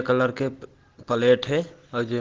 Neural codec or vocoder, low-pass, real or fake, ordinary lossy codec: none; 7.2 kHz; real; Opus, 16 kbps